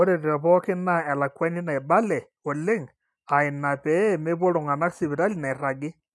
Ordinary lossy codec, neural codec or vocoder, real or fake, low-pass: none; none; real; none